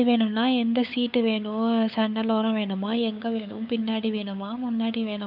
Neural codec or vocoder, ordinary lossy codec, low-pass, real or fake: codec, 16 kHz, 8 kbps, FunCodec, trained on LibriTTS, 25 frames a second; none; 5.4 kHz; fake